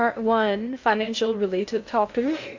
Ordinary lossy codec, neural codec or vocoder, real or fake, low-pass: AAC, 48 kbps; codec, 16 kHz in and 24 kHz out, 0.6 kbps, FocalCodec, streaming, 2048 codes; fake; 7.2 kHz